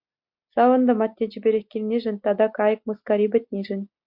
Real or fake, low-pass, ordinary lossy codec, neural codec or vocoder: real; 5.4 kHz; MP3, 48 kbps; none